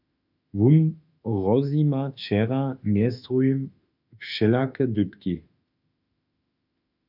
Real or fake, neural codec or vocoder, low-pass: fake; autoencoder, 48 kHz, 32 numbers a frame, DAC-VAE, trained on Japanese speech; 5.4 kHz